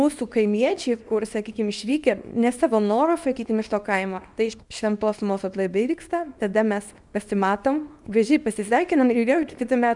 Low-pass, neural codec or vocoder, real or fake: 10.8 kHz; codec, 24 kHz, 0.9 kbps, WavTokenizer, small release; fake